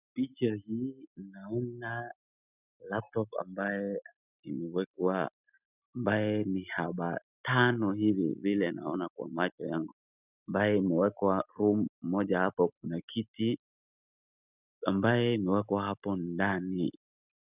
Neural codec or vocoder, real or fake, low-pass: none; real; 3.6 kHz